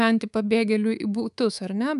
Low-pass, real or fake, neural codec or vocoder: 10.8 kHz; fake; codec, 24 kHz, 3.1 kbps, DualCodec